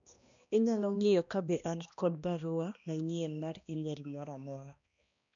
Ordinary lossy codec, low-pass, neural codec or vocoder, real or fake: none; 7.2 kHz; codec, 16 kHz, 1 kbps, X-Codec, HuBERT features, trained on balanced general audio; fake